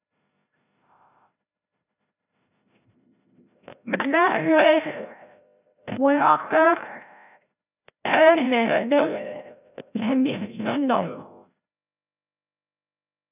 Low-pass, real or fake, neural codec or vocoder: 3.6 kHz; fake; codec, 16 kHz, 0.5 kbps, FreqCodec, larger model